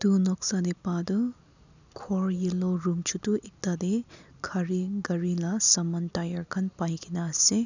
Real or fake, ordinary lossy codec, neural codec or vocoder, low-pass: real; none; none; 7.2 kHz